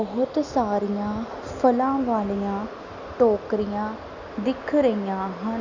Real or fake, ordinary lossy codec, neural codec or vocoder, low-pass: real; Opus, 64 kbps; none; 7.2 kHz